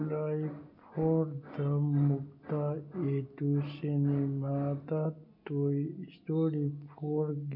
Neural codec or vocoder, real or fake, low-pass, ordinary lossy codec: none; real; 5.4 kHz; none